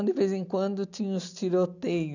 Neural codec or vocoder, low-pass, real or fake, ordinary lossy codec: none; 7.2 kHz; real; none